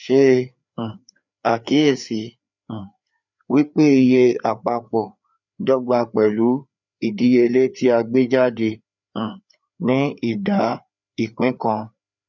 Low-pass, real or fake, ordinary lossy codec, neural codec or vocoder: 7.2 kHz; fake; none; codec, 16 kHz, 4 kbps, FreqCodec, larger model